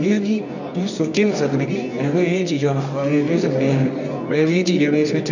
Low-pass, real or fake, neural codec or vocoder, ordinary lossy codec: 7.2 kHz; fake; codec, 24 kHz, 0.9 kbps, WavTokenizer, medium music audio release; none